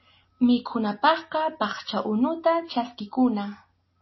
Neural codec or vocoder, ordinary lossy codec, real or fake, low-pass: none; MP3, 24 kbps; real; 7.2 kHz